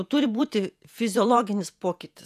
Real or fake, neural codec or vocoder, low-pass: fake; vocoder, 48 kHz, 128 mel bands, Vocos; 14.4 kHz